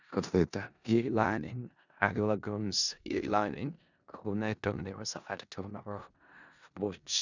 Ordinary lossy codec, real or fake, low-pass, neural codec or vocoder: none; fake; 7.2 kHz; codec, 16 kHz in and 24 kHz out, 0.4 kbps, LongCat-Audio-Codec, four codebook decoder